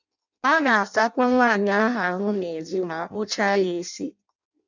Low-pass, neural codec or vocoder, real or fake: 7.2 kHz; codec, 16 kHz in and 24 kHz out, 0.6 kbps, FireRedTTS-2 codec; fake